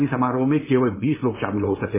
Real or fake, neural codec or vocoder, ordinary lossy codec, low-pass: fake; codec, 16 kHz, 4.8 kbps, FACodec; MP3, 16 kbps; 3.6 kHz